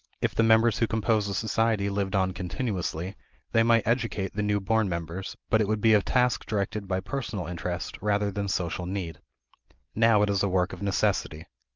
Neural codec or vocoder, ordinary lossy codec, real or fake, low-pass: none; Opus, 32 kbps; real; 7.2 kHz